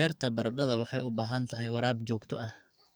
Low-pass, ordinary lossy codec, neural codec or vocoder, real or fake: none; none; codec, 44.1 kHz, 2.6 kbps, SNAC; fake